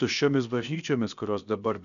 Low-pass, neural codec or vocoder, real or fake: 7.2 kHz; codec, 16 kHz, about 1 kbps, DyCAST, with the encoder's durations; fake